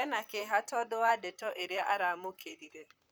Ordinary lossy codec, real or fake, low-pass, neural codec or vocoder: none; fake; none; vocoder, 44.1 kHz, 128 mel bands, Pupu-Vocoder